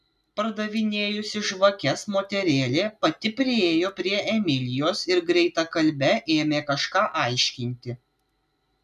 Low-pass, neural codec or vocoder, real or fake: 14.4 kHz; none; real